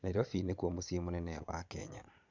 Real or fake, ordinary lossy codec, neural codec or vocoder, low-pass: fake; MP3, 64 kbps; vocoder, 22.05 kHz, 80 mel bands, Vocos; 7.2 kHz